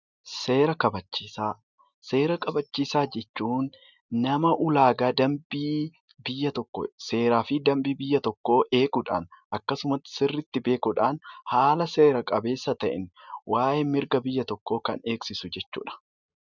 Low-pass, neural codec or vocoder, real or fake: 7.2 kHz; none; real